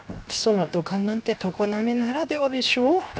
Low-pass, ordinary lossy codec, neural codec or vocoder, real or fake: none; none; codec, 16 kHz, 0.7 kbps, FocalCodec; fake